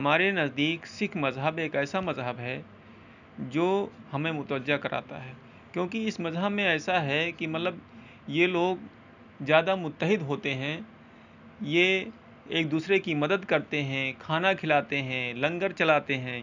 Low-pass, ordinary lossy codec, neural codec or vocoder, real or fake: 7.2 kHz; none; none; real